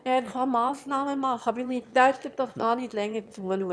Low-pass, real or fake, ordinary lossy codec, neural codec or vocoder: none; fake; none; autoencoder, 22.05 kHz, a latent of 192 numbers a frame, VITS, trained on one speaker